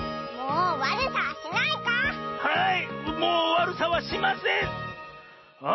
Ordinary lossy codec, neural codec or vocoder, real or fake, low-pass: MP3, 24 kbps; none; real; 7.2 kHz